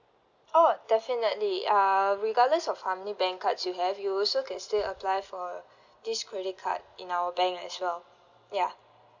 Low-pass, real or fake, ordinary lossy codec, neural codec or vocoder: 7.2 kHz; real; none; none